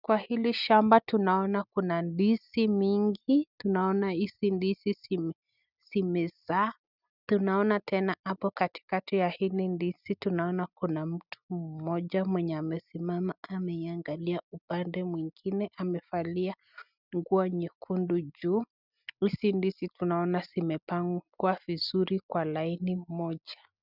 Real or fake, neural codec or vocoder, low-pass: real; none; 5.4 kHz